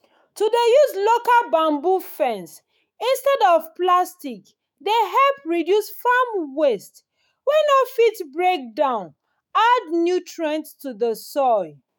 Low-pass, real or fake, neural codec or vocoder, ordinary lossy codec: none; fake; autoencoder, 48 kHz, 128 numbers a frame, DAC-VAE, trained on Japanese speech; none